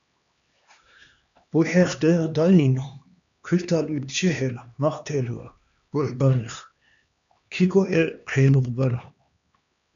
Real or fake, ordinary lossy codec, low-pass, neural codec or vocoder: fake; MP3, 96 kbps; 7.2 kHz; codec, 16 kHz, 2 kbps, X-Codec, HuBERT features, trained on LibriSpeech